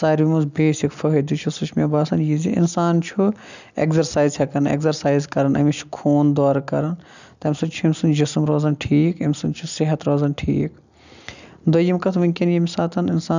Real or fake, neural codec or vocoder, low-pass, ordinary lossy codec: real; none; 7.2 kHz; none